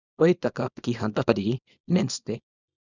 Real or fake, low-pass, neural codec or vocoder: fake; 7.2 kHz; codec, 24 kHz, 0.9 kbps, WavTokenizer, small release